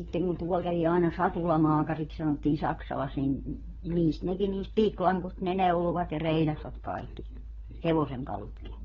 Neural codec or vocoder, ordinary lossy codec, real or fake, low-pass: codec, 16 kHz, 4 kbps, FunCodec, trained on LibriTTS, 50 frames a second; AAC, 24 kbps; fake; 7.2 kHz